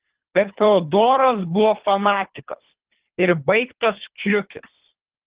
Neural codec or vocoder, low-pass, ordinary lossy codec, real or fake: codec, 24 kHz, 3 kbps, HILCodec; 3.6 kHz; Opus, 16 kbps; fake